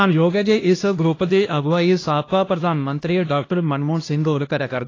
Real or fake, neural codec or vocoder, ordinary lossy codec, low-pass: fake; codec, 16 kHz, 1 kbps, X-Codec, HuBERT features, trained on LibriSpeech; AAC, 32 kbps; 7.2 kHz